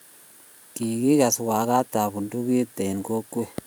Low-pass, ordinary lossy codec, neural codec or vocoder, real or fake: none; none; vocoder, 44.1 kHz, 128 mel bands every 512 samples, BigVGAN v2; fake